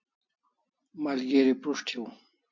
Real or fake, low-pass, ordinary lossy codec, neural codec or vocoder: real; 7.2 kHz; MP3, 48 kbps; none